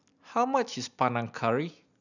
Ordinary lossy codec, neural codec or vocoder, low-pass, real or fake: none; none; 7.2 kHz; real